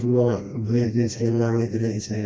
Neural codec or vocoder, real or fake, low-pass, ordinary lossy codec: codec, 16 kHz, 1 kbps, FreqCodec, smaller model; fake; none; none